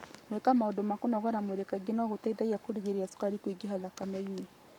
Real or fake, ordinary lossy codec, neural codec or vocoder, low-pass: fake; none; codec, 44.1 kHz, 7.8 kbps, Pupu-Codec; 19.8 kHz